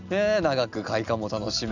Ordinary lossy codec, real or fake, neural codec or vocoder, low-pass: none; real; none; 7.2 kHz